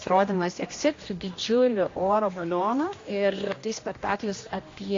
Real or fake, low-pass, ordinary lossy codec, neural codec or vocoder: fake; 7.2 kHz; AAC, 32 kbps; codec, 16 kHz, 1 kbps, X-Codec, HuBERT features, trained on general audio